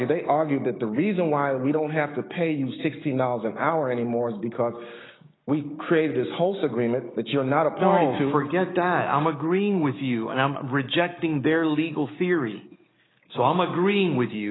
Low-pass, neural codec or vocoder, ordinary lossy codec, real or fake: 7.2 kHz; codec, 16 kHz, 6 kbps, DAC; AAC, 16 kbps; fake